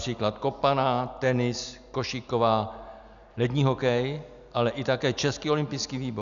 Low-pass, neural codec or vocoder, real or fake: 7.2 kHz; none; real